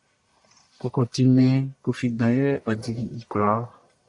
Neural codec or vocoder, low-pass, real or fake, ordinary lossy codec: codec, 44.1 kHz, 1.7 kbps, Pupu-Codec; 10.8 kHz; fake; AAC, 64 kbps